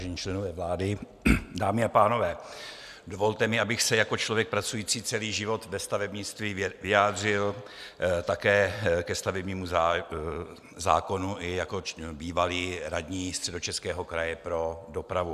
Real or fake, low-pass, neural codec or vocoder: real; 14.4 kHz; none